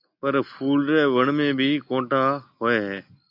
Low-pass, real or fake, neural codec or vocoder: 5.4 kHz; real; none